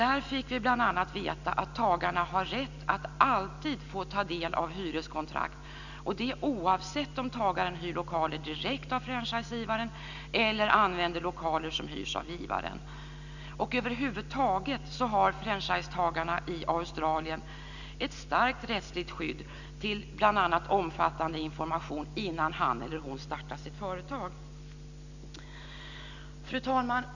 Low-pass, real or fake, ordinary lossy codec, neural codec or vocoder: 7.2 kHz; real; none; none